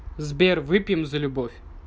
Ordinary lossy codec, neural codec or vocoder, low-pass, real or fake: none; none; none; real